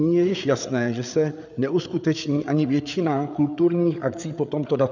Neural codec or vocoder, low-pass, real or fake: codec, 16 kHz, 8 kbps, FreqCodec, larger model; 7.2 kHz; fake